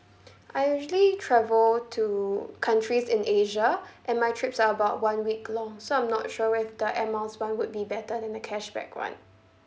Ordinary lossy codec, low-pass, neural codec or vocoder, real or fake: none; none; none; real